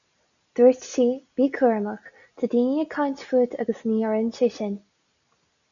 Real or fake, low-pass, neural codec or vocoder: real; 7.2 kHz; none